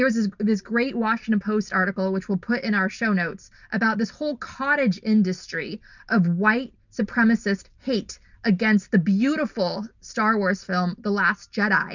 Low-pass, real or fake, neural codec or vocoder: 7.2 kHz; real; none